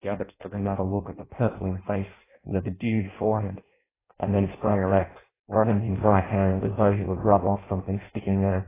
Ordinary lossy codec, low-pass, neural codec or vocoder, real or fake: AAC, 16 kbps; 3.6 kHz; codec, 16 kHz in and 24 kHz out, 0.6 kbps, FireRedTTS-2 codec; fake